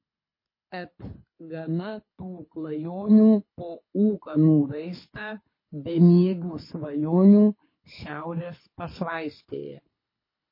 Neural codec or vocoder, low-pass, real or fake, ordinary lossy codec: codec, 24 kHz, 6 kbps, HILCodec; 5.4 kHz; fake; MP3, 24 kbps